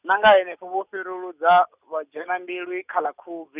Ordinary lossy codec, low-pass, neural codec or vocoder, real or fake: none; 3.6 kHz; none; real